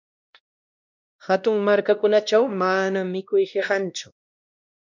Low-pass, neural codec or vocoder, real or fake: 7.2 kHz; codec, 16 kHz, 1 kbps, X-Codec, WavLM features, trained on Multilingual LibriSpeech; fake